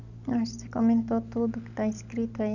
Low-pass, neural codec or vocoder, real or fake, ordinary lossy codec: 7.2 kHz; none; real; none